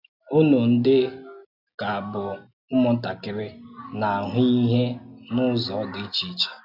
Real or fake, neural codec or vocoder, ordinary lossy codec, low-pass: real; none; none; 5.4 kHz